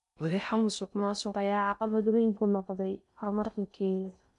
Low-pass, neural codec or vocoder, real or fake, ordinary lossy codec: 10.8 kHz; codec, 16 kHz in and 24 kHz out, 0.6 kbps, FocalCodec, streaming, 2048 codes; fake; none